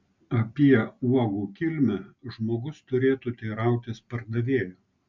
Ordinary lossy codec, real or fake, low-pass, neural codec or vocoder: MP3, 64 kbps; real; 7.2 kHz; none